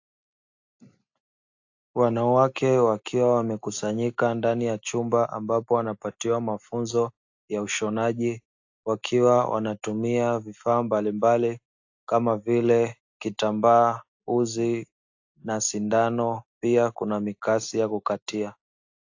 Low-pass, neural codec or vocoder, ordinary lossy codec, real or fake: 7.2 kHz; none; AAC, 48 kbps; real